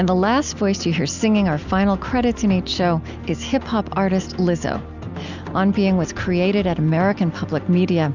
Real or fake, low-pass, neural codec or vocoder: real; 7.2 kHz; none